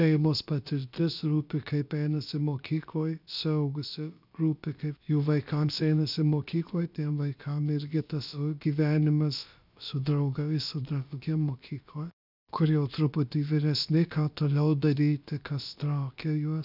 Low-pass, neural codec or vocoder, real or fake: 5.4 kHz; codec, 16 kHz, about 1 kbps, DyCAST, with the encoder's durations; fake